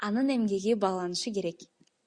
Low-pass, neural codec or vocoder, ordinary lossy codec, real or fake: 9.9 kHz; none; Opus, 64 kbps; real